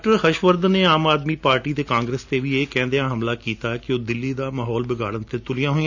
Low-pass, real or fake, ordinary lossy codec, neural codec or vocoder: 7.2 kHz; real; none; none